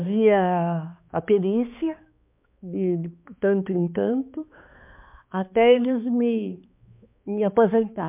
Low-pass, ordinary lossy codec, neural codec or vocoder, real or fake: 3.6 kHz; MP3, 32 kbps; codec, 16 kHz, 2 kbps, X-Codec, HuBERT features, trained on balanced general audio; fake